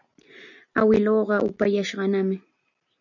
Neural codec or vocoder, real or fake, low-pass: none; real; 7.2 kHz